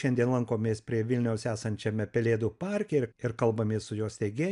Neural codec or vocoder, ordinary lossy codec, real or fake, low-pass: none; MP3, 96 kbps; real; 10.8 kHz